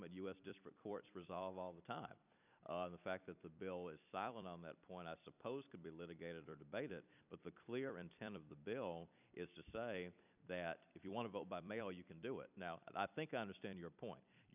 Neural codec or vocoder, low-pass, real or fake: vocoder, 44.1 kHz, 128 mel bands every 256 samples, BigVGAN v2; 3.6 kHz; fake